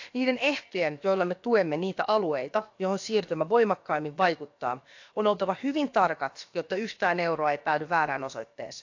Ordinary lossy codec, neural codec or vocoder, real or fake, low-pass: AAC, 48 kbps; codec, 16 kHz, about 1 kbps, DyCAST, with the encoder's durations; fake; 7.2 kHz